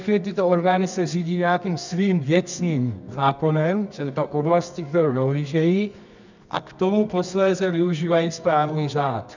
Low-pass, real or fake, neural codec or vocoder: 7.2 kHz; fake; codec, 24 kHz, 0.9 kbps, WavTokenizer, medium music audio release